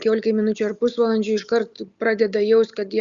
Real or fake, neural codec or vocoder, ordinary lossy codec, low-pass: real; none; Opus, 64 kbps; 7.2 kHz